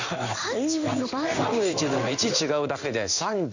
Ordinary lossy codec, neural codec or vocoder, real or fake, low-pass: none; codec, 16 kHz in and 24 kHz out, 1 kbps, XY-Tokenizer; fake; 7.2 kHz